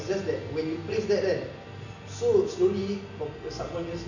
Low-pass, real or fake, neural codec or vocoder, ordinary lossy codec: 7.2 kHz; real; none; none